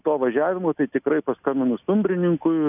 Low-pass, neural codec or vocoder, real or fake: 3.6 kHz; none; real